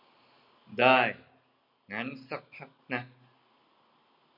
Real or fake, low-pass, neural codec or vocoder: real; 5.4 kHz; none